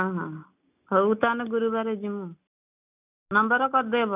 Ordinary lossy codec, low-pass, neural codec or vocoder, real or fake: none; 3.6 kHz; none; real